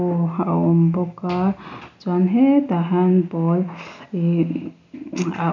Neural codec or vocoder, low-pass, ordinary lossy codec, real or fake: none; 7.2 kHz; none; real